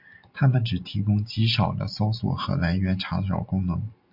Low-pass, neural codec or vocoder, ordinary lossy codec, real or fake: 5.4 kHz; none; MP3, 48 kbps; real